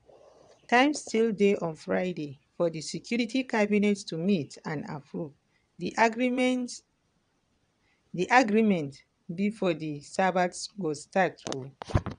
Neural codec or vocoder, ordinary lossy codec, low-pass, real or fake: vocoder, 22.05 kHz, 80 mel bands, Vocos; none; 9.9 kHz; fake